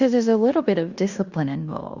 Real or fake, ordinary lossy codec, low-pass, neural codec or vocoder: fake; Opus, 64 kbps; 7.2 kHz; codec, 16 kHz in and 24 kHz out, 0.9 kbps, LongCat-Audio-Codec, fine tuned four codebook decoder